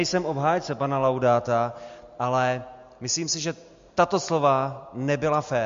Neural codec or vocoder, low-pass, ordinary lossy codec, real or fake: none; 7.2 kHz; MP3, 48 kbps; real